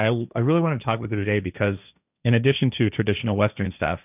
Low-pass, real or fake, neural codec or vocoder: 3.6 kHz; fake; codec, 16 kHz, 1.1 kbps, Voila-Tokenizer